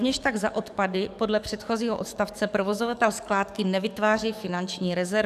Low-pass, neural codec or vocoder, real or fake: 14.4 kHz; codec, 44.1 kHz, 7.8 kbps, DAC; fake